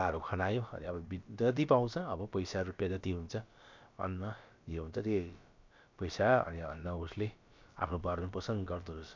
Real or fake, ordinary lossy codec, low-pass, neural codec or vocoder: fake; MP3, 64 kbps; 7.2 kHz; codec, 16 kHz, about 1 kbps, DyCAST, with the encoder's durations